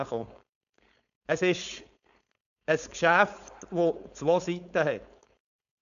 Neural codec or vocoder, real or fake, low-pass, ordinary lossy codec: codec, 16 kHz, 4.8 kbps, FACodec; fake; 7.2 kHz; none